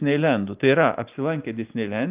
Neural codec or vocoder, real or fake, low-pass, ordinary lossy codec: none; real; 3.6 kHz; Opus, 24 kbps